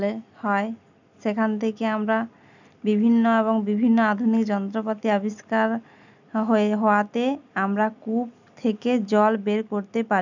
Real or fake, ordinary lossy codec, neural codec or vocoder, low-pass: real; none; none; 7.2 kHz